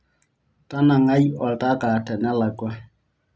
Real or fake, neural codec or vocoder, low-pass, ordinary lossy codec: real; none; none; none